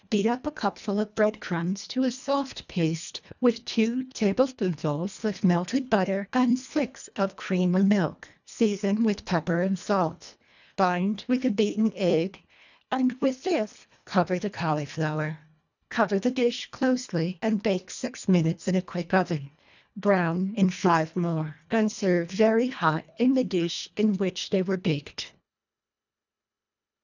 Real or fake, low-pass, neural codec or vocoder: fake; 7.2 kHz; codec, 24 kHz, 1.5 kbps, HILCodec